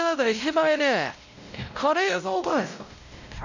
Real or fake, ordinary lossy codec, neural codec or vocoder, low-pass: fake; none; codec, 16 kHz, 0.5 kbps, X-Codec, WavLM features, trained on Multilingual LibriSpeech; 7.2 kHz